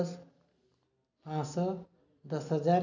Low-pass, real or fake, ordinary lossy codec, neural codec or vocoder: 7.2 kHz; real; none; none